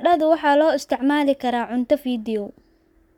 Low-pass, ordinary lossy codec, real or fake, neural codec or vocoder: 19.8 kHz; none; fake; codec, 44.1 kHz, 7.8 kbps, Pupu-Codec